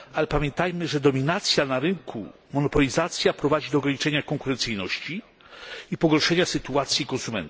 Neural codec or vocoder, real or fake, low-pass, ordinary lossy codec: none; real; none; none